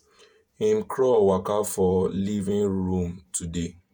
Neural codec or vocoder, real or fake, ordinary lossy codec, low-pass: vocoder, 48 kHz, 128 mel bands, Vocos; fake; none; none